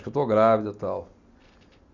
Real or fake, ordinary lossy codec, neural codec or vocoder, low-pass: real; Opus, 64 kbps; none; 7.2 kHz